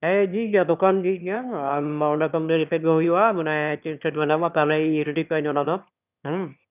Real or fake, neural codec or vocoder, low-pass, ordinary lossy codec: fake; autoencoder, 22.05 kHz, a latent of 192 numbers a frame, VITS, trained on one speaker; 3.6 kHz; none